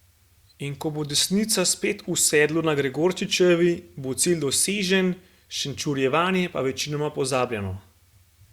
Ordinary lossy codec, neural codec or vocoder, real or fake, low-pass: Opus, 64 kbps; none; real; 19.8 kHz